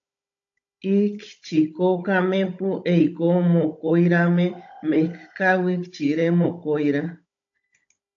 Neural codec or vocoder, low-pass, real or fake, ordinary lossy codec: codec, 16 kHz, 16 kbps, FunCodec, trained on Chinese and English, 50 frames a second; 7.2 kHz; fake; AAC, 48 kbps